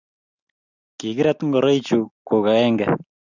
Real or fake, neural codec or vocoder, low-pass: real; none; 7.2 kHz